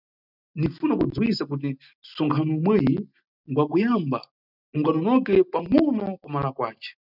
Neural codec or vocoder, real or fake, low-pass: none; real; 5.4 kHz